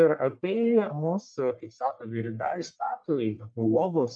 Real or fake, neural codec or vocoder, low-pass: fake; codec, 44.1 kHz, 1.7 kbps, Pupu-Codec; 9.9 kHz